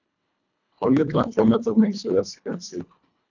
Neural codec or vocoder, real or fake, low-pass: codec, 24 kHz, 1.5 kbps, HILCodec; fake; 7.2 kHz